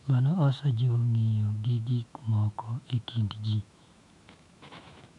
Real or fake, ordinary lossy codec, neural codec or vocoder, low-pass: fake; none; autoencoder, 48 kHz, 128 numbers a frame, DAC-VAE, trained on Japanese speech; 10.8 kHz